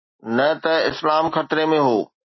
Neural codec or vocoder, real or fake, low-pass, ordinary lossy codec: none; real; 7.2 kHz; MP3, 24 kbps